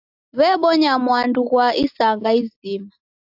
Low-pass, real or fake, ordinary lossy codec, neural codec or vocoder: 5.4 kHz; real; AAC, 48 kbps; none